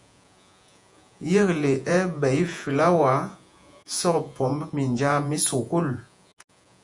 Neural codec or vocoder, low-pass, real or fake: vocoder, 48 kHz, 128 mel bands, Vocos; 10.8 kHz; fake